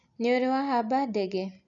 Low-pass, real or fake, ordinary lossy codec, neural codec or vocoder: 7.2 kHz; real; none; none